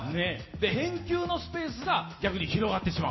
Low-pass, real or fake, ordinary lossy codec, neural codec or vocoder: 7.2 kHz; real; MP3, 24 kbps; none